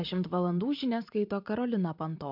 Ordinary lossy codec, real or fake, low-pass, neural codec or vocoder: MP3, 48 kbps; fake; 5.4 kHz; vocoder, 44.1 kHz, 128 mel bands every 512 samples, BigVGAN v2